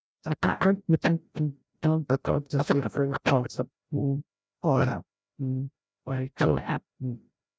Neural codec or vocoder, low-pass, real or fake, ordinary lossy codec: codec, 16 kHz, 0.5 kbps, FreqCodec, larger model; none; fake; none